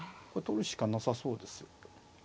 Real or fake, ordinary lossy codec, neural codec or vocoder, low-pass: real; none; none; none